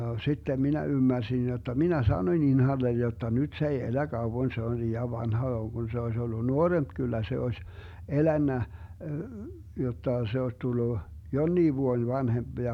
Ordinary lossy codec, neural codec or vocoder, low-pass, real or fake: none; none; 19.8 kHz; real